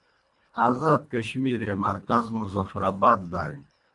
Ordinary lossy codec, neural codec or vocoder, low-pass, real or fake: MP3, 64 kbps; codec, 24 kHz, 1.5 kbps, HILCodec; 10.8 kHz; fake